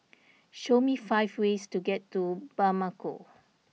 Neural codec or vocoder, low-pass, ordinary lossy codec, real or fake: none; none; none; real